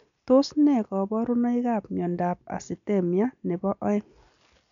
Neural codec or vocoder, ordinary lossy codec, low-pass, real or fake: none; none; 7.2 kHz; real